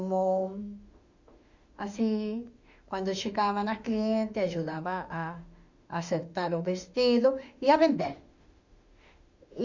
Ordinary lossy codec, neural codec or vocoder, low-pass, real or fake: Opus, 64 kbps; autoencoder, 48 kHz, 32 numbers a frame, DAC-VAE, trained on Japanese speech; 7.2 kHz; fake